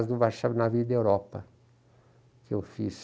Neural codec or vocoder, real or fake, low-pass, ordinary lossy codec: none; real; none; none